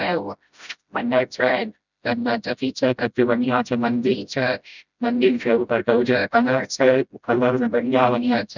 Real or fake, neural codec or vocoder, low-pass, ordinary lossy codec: fake; codec, 16 kHz, 0.5 kbps, FreqCodec, smaller model; 7.2 kHz; none